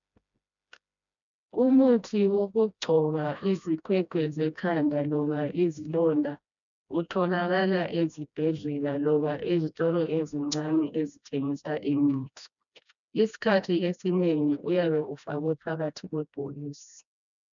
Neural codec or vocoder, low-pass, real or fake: codec, 16 kHz, 1 kbps, FreqCodec, smaller model; 7.2 kHz; fake